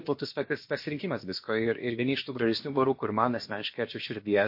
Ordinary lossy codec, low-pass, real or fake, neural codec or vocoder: MP3, 32 kbps; 5.4 kHz; fake; codec, 16 kHz, about 1 kbps, DyCAST, with the encoder's durations